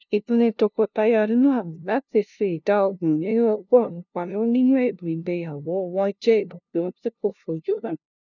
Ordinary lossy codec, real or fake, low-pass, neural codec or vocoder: none; fake; 7.2 kHz; codec, 16 kHz, 0.5 kbps, FunCodec, trained on LibriTTS, 25 frames a second